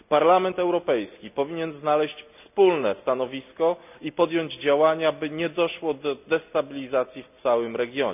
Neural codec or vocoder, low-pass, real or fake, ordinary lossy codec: none; 3.6 kHz; real; none